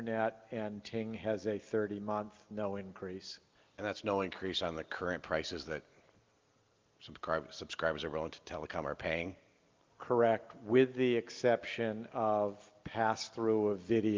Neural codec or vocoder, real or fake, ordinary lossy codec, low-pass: none; real; Opus, 32 kbps; 7.2 kHz